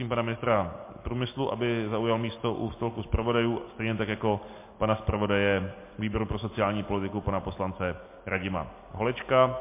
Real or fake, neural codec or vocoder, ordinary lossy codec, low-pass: real; none; MP3, 24 kbps; 3.6 kHz